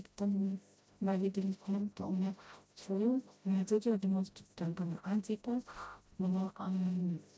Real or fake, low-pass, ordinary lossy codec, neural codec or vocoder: fake; none; none; codec, 16 kHz, 0.5 kbps, FreqCodec, smaller model